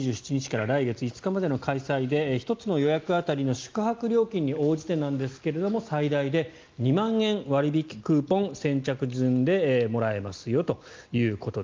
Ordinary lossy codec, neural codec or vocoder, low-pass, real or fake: Opus, 32 kbps; none; 7.2 kHz; real